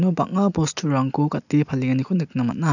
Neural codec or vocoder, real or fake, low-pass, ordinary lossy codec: none; real; 7.2 kHz; none